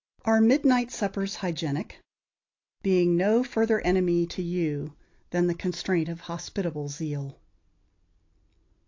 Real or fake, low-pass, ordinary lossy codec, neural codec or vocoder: real; 7.2 kHz; AAC, 48 kbps; none